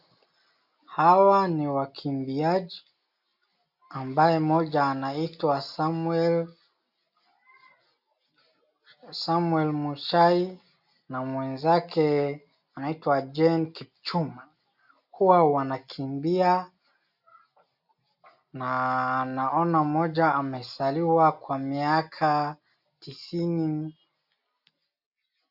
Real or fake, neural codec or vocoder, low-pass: real; none; 5.4 kHz